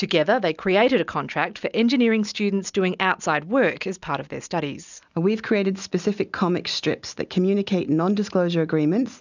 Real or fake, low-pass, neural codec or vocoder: real; 7.2 kHz; none